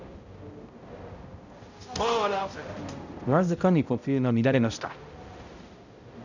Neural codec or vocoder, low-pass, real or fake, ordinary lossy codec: codec, 16 kHz, 0.5 kbps, X-Codec, HuBERT features, trained on balanced general audio; 7.2 kHz; fake; none